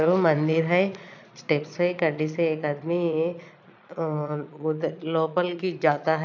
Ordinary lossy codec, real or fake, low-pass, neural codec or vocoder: none; fake; 7.2 kHz; vocoder, 22.05 kHz, 80 mel bands, Vocos